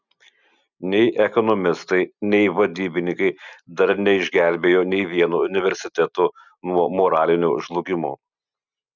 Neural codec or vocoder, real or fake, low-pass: none; real; 7.2 kHz